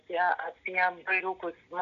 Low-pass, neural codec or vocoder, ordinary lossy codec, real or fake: 7.2 kHz; none; MP3, 96 kbps; real